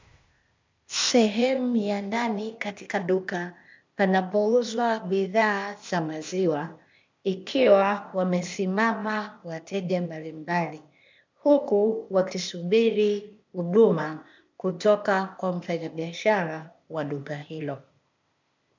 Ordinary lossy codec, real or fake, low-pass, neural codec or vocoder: MP3, 64 kbps; fake; 7.2 kHz; codec, 16 kHz, 0.8 kbps, ZipCodec